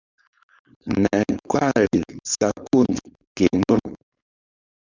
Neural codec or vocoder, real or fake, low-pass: codec, 16 kHz, 4.8 kbps, FACodec; fake; 7.2 kHz